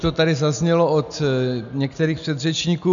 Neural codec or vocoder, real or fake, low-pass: none; real; 7.2 kHz